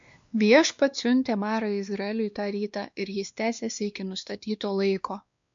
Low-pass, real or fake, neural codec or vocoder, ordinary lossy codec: 7.2 kHz; fake; codec, 16 kHz, 2 kbps, X-Codec, WavLM features, trained on Multilingual LibriSpeech; MP3, 64 kbps